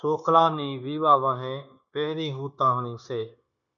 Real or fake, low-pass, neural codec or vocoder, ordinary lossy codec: fake; 7.2 kHz; codec, 16 kHz, 0.9 kbps, LongCat-Audio-Codec; MP3, 64 kbps